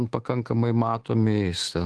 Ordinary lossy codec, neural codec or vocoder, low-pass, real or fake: Opus, 16 kbps; codec, 24 kHz, 3.1 kbps, DualCodec; 10.8 kHz; fake